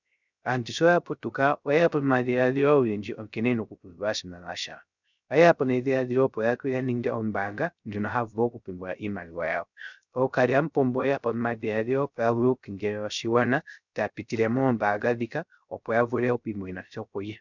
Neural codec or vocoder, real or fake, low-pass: codec, 16 kHz, 0.3 kbps, FocalCodec; fake; 7.2 kHz